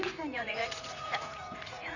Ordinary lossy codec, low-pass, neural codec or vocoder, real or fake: none; 7.2 kHz; vocoder, 44.1 kHz, 80 mel bands, Vocos; fake